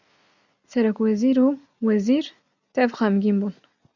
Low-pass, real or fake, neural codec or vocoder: 7.2 kHz; real; none